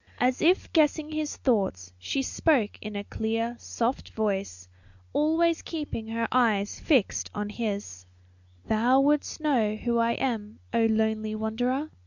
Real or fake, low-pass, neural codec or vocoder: real; 7.2 kHz; none